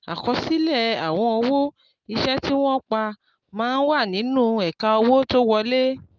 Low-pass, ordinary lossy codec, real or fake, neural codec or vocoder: 7.2 kHz; Opus, 32 kbps; real; none